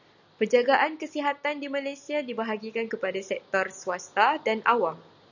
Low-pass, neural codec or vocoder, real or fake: 7.2 kHz; none; real